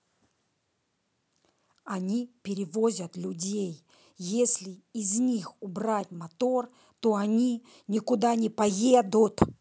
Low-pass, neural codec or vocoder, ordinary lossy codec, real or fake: none; none; none; real